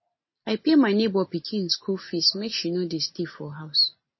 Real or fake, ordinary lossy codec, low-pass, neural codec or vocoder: real; MP3, 24 kbps; 7.2 kHz; none